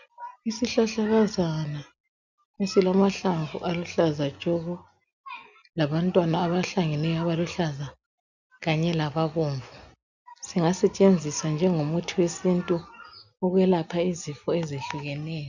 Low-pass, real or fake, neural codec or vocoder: 7.2 kHz; real; none